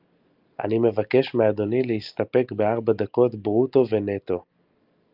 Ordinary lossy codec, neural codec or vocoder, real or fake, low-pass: Opus, 24 kbps; none; real; 5.4 kHz